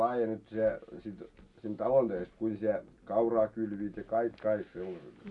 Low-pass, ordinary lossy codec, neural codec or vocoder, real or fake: 10.8 kHz; none; none; real